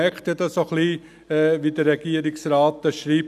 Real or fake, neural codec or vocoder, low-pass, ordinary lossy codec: real; none; 14.4 kHz; none